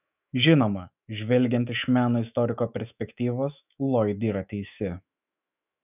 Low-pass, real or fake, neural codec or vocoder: 3.6 kHz; fake; autoencoder, 48 kHz, 128 numbers a frame, DAC-VAE, trained on Japanese speech